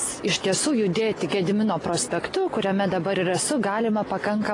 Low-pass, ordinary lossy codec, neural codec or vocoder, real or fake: 10.8 kHz; AAC, 32 kbps; none; real